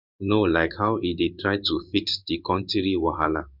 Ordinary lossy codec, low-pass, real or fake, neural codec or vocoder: none; 5.4 kHz; fake; codec, 16 kHz in and 24 kHz out, 1 kbps, XY-Tokenizer